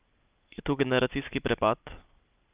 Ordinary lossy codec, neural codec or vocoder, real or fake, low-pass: Opus, 24 kbps; none; real; 3.6 kHz